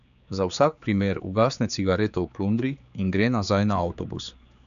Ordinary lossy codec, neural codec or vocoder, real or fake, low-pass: none; codec, 16 kHz, 4 kbps, X-Codec, HuBERT features, trained on general audio; fake; 7.2 kHz